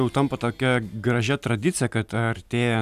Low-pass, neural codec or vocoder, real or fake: 14.4 kHz; none; real